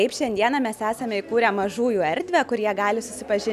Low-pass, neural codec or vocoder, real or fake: 14.4 kHz; none; real